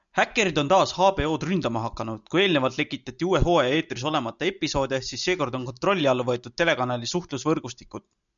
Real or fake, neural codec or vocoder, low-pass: real; none; 7.2 kHz